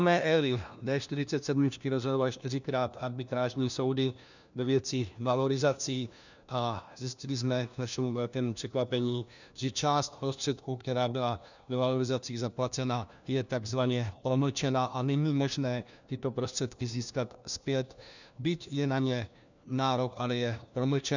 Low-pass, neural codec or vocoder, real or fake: 7.2 kHz; codec, 16 kHz, 1 kbps, FunCodec, trained on LibriTTS, 50 frames a second; fake